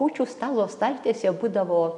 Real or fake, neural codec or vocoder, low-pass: real; none; 10.8 kHz